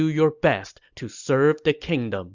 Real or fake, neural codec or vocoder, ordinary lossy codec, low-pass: real; none; Opus, 64 kbps; 7.2 kHz